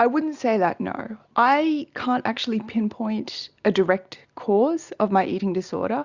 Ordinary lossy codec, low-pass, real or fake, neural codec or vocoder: Opus, 64 kbps; 7.2 kHz; real; none